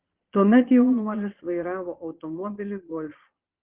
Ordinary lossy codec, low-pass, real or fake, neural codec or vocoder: Opus, 16 kbps; 3.6 kHz; fake; vocoder, 22.05 kHz, 80 mel bands, Vocos